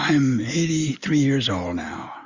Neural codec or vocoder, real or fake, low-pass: none; real; 7.2 kHz